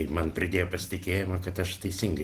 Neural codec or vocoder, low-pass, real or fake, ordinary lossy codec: none; 14.4 kHz; real; Opus, 16 kbps